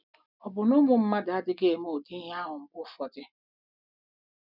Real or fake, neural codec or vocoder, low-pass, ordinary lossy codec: real; none; 5.4 kHz; none